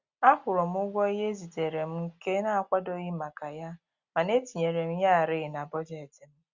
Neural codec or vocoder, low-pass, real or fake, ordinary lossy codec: none; 7.2 kHz; real; Opus, 64 kbps